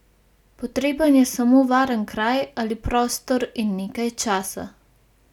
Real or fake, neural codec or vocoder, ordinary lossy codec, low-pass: real; none; none; 19.8 kHz